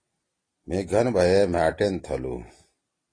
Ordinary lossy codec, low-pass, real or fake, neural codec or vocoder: AAC, 32 kbps; 9.9 kHz; real; none